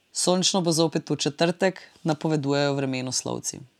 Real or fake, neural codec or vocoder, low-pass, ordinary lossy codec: real; none; 19.8 kHz; none